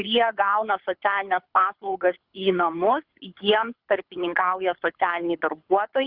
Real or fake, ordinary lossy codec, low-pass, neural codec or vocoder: fake; Opus, 64 kbps; 5.4 kHz; codec, 24 kHz, 6 kbps, HILCodec